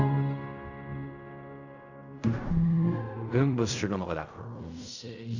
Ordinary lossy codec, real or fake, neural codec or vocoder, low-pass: none; fake; codec, 16 kHz in and 24 kHz out, 0.4 kbps, LongCat-Audio-Codec, fine tuned four codebook decoder; 7.2 kHz